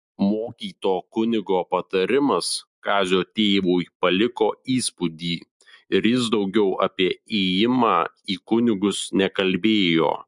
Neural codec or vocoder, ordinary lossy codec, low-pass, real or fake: none; MP3, 64 kbps; 10.8 kHz; real